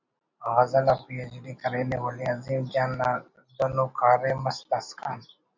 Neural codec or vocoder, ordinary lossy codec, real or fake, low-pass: none; MP3, 64 kbps; real; 7.2 kHz